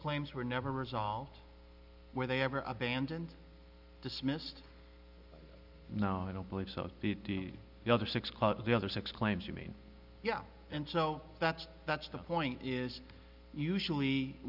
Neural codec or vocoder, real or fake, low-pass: none; real; 5.4 kHz